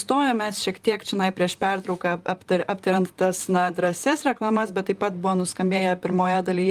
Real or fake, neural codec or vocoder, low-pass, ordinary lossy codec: fake; vocoder, 44.1 kHz, 128 mel bands, Pupu-Vocoder; 14.4 kHz; Opus, 32 kbps